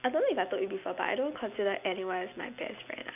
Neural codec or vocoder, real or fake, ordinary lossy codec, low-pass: none; real; none; 3.6 kHz